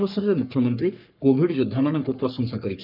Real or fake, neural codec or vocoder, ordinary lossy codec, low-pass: fake; codec, 44.1 kHz, 3.4 kbps, Pupu-Codec; none; 5.4 kHz